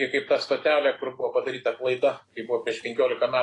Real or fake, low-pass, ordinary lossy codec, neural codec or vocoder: real; 10.8 kHz; AAC, 32 kbps; none